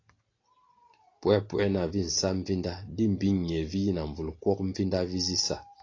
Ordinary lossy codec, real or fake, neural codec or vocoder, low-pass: AAC, 32 kbps; real; none; 7.2 kHz